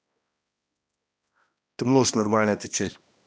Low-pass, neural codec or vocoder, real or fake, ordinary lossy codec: none; codec, 16 kHz, 1 kbps, X-Codec, HuBERT features, trained on balanced general audio; fake; none